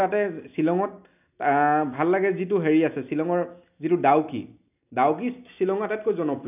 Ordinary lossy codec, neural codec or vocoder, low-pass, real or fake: none; none; 3.6 kHz; real